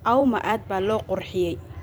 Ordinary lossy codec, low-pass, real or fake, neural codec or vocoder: none; none; fake; vocoder, 44.1 kHz, 128 mel bands every 256 samples, BigVGAN v2